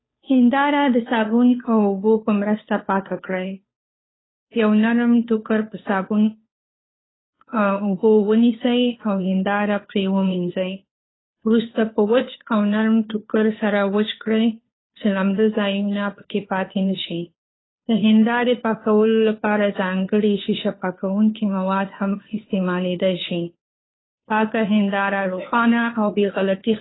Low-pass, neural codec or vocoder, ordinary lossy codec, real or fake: 7.2 kHz; codec, 16 kHz, 2 kbps, FunCodec, trained on Chinese and English, 25 frames a second; AAC, 16 kbps; fake